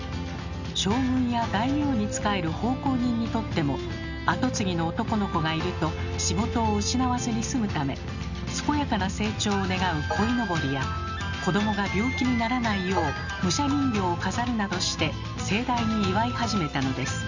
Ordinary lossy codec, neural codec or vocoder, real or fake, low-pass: none; none; real; 7.2 kHz